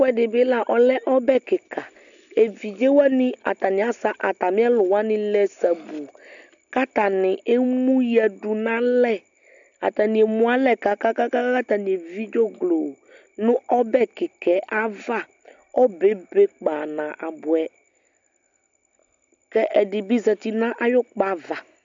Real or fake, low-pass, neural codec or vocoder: real; 7.2 kHz; none